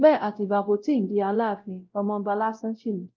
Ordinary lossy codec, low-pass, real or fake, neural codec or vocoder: Opus, 24 kbps; 7.2 kHz; fake; codec, 16 kHz, 0.5 kbps, X-Codec, WavLM features, trained on Multilingual LibriSpeech